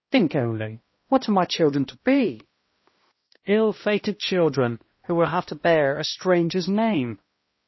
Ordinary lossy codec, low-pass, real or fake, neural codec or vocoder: MP3, 24 kbps; 7.2 kHz; fake; codec, 16 kHz, 1 kbps, X-Codec, HuBERT features, trained on balanced general audio